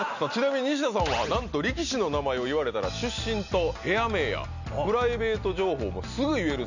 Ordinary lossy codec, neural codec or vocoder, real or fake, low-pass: none; none; real; 7.2 kHz